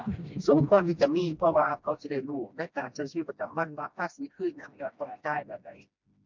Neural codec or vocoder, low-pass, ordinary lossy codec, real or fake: codec, 16 kHz, 1 kbps, FreqCodec, smaller model; 7.2 kHz; MP3, 64 kbps; fake